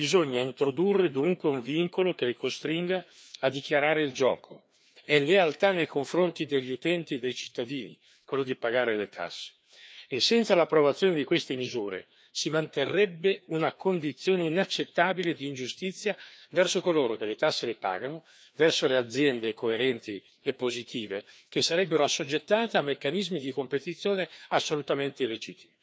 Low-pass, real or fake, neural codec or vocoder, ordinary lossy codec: none; fake; codec, 16 kHz, 2 kbps, FreqCodec, larger model; none